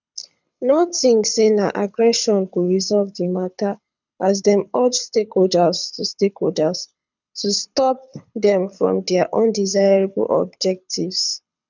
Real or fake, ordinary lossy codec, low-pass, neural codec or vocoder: fake; none; 7.2 kHz; codec, 24 kHz, 6 kbps, HILCodec